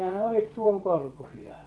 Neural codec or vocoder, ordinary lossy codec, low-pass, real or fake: vocoder, 22.05 kHz, 80 mel bands, WaveNeXt; none; none; fake